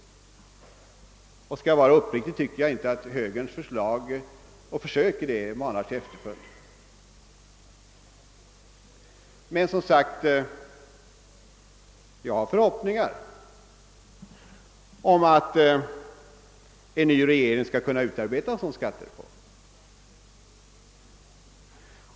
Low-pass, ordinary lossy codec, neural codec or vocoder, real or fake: none; none; none; real